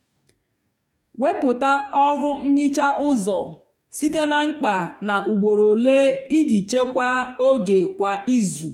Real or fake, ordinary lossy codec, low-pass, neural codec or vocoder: fake; none; 19.8 kHz; codec, 44.1 kHz, 2.6 kbps, DAC